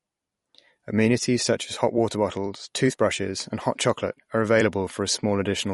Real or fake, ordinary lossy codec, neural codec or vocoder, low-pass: fake; MP3, 48 kbps; vocoder, 44.1 kHz, 128 mel bands every 256 samples, BigVGAN v2; 19.8 kHz